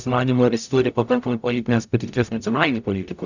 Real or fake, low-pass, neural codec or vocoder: fake; 7.2 kHz; codec, 44.1 kHz, 0.9 kbps, DAC